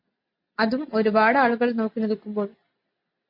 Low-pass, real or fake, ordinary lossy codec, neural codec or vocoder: 5.4 kHz; real; MP3, 32 kbps; none